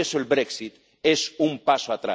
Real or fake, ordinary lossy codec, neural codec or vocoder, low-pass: real; none; none; none